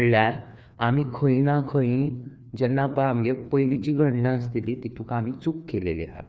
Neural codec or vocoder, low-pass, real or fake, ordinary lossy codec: codec, 16 kHz, 2 kbps, FreqCodec, larger model; none; fake; none